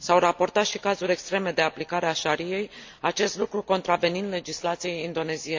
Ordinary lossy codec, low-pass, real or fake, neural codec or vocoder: MP3, 64 kbps; 7.2 kHz; real; none